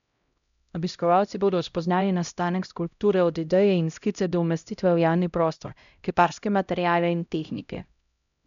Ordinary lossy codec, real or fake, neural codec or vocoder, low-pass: none; fake; codec, 16 kHz, 0.5 kbps, X-Codec, HuBERT features, trained on LibriSpeech; 7.2 kHz